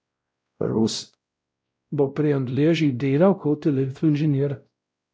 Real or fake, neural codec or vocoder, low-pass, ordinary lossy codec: fake; codec, 16 kHz, 0.5 kbps, X-Codec, WavLM features, trained on Multilingual LibriSpeech; none; none